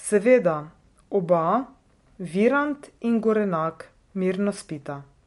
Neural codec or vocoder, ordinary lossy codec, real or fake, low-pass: none; MP3, 48 kbps; real; 14.4 kHz